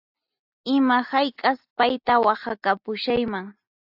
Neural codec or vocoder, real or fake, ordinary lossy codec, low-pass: none; real; AAC, 48 kbps; 5.4 kHz